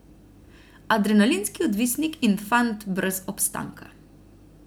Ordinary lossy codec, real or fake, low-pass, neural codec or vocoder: none; real; none; none